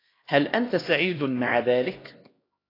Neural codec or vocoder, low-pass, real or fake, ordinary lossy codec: codec, 16 kHz, 1 kbps, X-Codec, HuBERT features, trained on LibriSpeech; 5.4 kHz; fake; AAC, 24 kbps